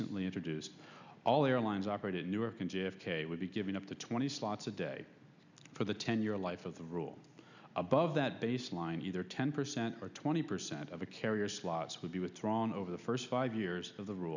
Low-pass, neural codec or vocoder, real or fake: 7.2 kHz; none; real